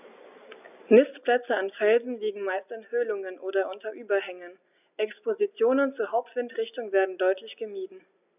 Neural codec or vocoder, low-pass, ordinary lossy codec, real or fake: none; 3.6 kHz; none; real